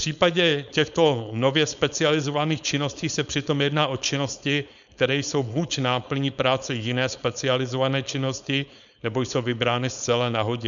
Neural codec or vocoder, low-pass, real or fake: codec, 16 kHz, 4.8 kbps, FACodec; 7.2 kHz; fake